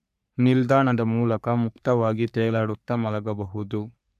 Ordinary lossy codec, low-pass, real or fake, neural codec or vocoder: none; 14.4 kHz; fake; codec, 44.1 kHz, 3.4 kbps, Pupu-Codec